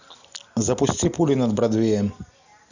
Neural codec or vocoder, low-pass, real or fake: none; 7.2 kHz; real